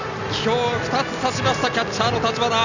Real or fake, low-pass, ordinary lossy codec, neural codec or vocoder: real; 7.2 kHz; none; none